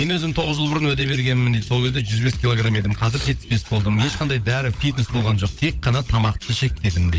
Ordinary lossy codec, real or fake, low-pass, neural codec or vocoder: none; fake; none; codec, 16 kHz, 16 kbps, FunCodec, trained on LibriTTS, 50 frames a second